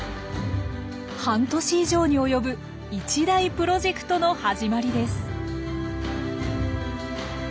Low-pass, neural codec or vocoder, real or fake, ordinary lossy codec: none; none; real; none